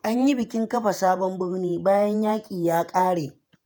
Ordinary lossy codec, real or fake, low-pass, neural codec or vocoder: none; fake; none; vocoder, 48 kHz, 128 mel bands, Vocos